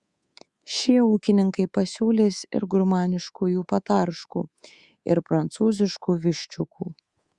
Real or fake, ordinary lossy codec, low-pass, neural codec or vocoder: fake; Opus, 64 kbps; 10.8 kHz; codec, 24 kHz, 3.1 kbps, DualCodec